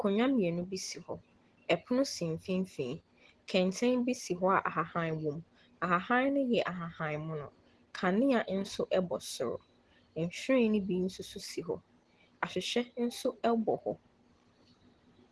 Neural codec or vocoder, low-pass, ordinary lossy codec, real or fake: none; 10.8 kHz; Opus, 16 kbps; real